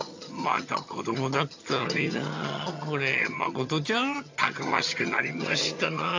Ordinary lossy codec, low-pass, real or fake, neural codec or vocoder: AAC, 48 kbps; 7.2 kHz; fake; vocoder, 22.05 kHz, 80 mel bands, HiFi-GAN